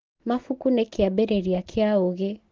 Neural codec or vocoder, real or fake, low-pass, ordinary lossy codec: none; real; 7.2 kHz; Opus, 16 kbps